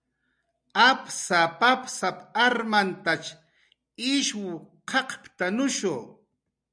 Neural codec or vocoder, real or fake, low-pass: none; real; 9.9 kHz